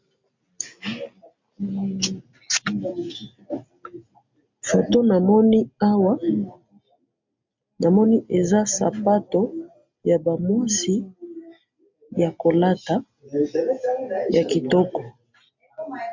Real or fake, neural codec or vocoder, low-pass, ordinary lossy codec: real; none; 7.2 kHz; MP3, 64 kbps